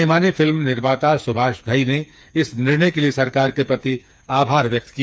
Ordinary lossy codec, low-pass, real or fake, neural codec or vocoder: none; none; fake; codec, 16 kHz, 4 kbps, FreqCodec, smaller model